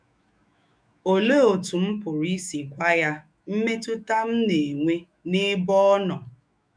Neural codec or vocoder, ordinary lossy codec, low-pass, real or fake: autoencoder, 48 kHz, 128 numbers a frame, DAC-VAE, trained on Japanese speech; none; 9.9 kHz; fake